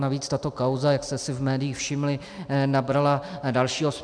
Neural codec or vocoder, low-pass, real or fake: none; 9.9 kHz; real